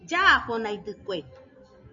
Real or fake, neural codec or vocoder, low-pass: real; none; 7.2 kHz